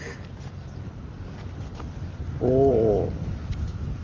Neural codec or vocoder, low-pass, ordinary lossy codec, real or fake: none; 7.2 kHz; Opus, 32 kbps; real